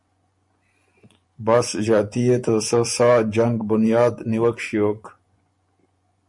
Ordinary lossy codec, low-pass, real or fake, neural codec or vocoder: MP3, 48 kbps; 10.8 kHz; fake; vocoder, 24 kHz, 100 mel bands, Vocos